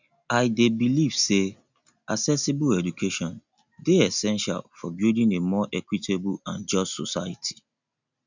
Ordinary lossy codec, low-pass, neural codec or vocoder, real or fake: none; 7.2 kHz; none; real